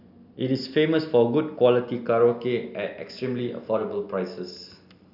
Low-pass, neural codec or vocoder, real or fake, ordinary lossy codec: 5.4 kHz; none; real; none